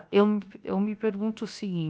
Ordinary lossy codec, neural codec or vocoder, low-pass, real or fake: none; codec, 16 kHz, 0.7 kbps, FocalCodec; none; fake